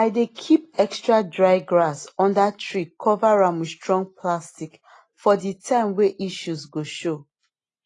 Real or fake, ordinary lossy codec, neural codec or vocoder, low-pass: real; AAC, 32 kbps; none; 10.8 kHz